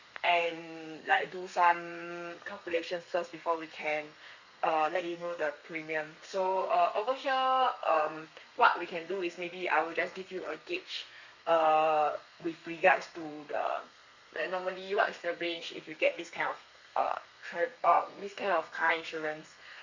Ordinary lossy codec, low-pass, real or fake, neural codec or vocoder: Opus, 64 kbps; 7.2 kHz; fake; codec, 32 kHz, 1.9 kbps, SNAC